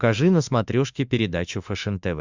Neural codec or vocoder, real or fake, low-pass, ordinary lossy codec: autoencoder, 48 kHz, 32 numbers a frame, DAC-VAE, trained on Japanese speech; fake; 7.2 kHz; Opus, 64 kbps